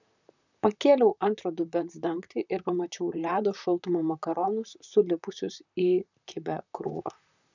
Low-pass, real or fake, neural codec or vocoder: 7.2 kHz; fake; vocoder, 44.1 kHz, 128 mel bands, Pupu-Vocoder